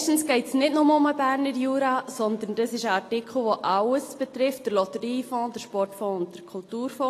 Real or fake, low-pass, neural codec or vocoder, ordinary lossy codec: real; 14.4 kHz; none; AAC, 48 kbps